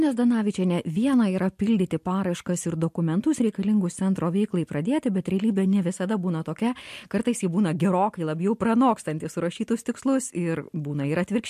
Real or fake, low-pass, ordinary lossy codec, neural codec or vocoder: real; 14.4 kHz; MP3, 64 kbps; none